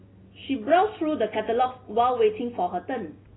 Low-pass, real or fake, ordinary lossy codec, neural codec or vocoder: 7.2 kHz; real; AAC, 16 kbps; none